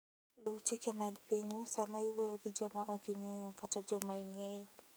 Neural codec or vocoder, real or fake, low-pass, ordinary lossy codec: codec, 44.1 kHz, 2.6 kbps, SNAC; fake; none; none